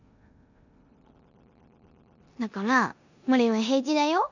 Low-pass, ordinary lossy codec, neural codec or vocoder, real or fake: 7.2 kHz; none; codec, 16 kHz in and 24 kHz out, 0.9 kbps, LongCat-Audio-Codec, four codebook decoder; fake